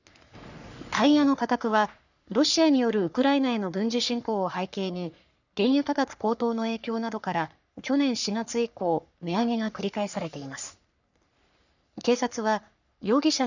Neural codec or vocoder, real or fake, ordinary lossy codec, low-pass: codec, 44.1 kHz, 3.4 kbps, Pupu-Codec; fake; none; 7.2 kHz